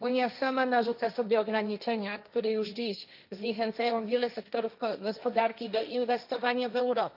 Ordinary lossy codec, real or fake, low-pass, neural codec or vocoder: none; fake; 5.4 kHz; codec, 16 kHz, 1.1 kbps, Voila-Tokenizer